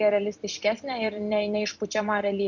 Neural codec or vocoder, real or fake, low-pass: vocoder, 44.1 kHz, 128 mel bands every 256 samples, BigVGAN v2; fake; 7.2 kHz